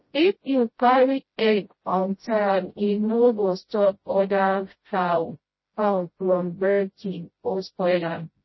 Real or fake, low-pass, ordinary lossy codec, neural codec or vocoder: fake; 7.2 kHz; MP3, 24 kbps; codec, 16 kHz, 0.5 kbps, FreqCodec, smaller model